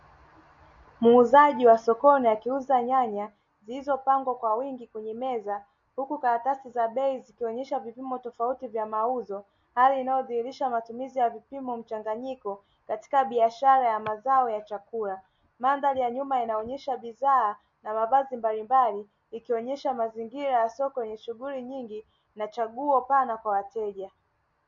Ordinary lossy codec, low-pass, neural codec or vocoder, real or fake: MP3, 48 kbps; 7.2 kHz; none; real